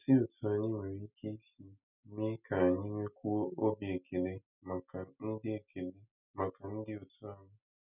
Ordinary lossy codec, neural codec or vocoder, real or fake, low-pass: none; none; real; 3.6 kHz